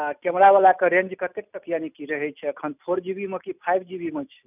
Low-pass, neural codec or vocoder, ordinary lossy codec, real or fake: 3.6 kHz; none; none; real